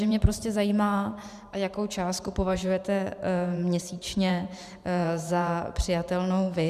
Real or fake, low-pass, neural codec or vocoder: fake; 14.4 kHz; vocoder, 44.1 kHz, 128 mel bands every 512 samples, BigVGAN v2